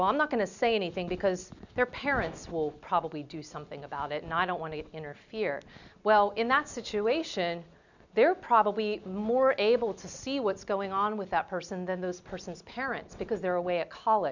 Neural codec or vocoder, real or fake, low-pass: none; real; 7.2 kHz